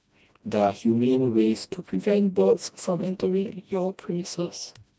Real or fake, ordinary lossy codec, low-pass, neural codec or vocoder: fake; none; none; codec, 16 kHz, 1 kbps, FreqCodec, smaller model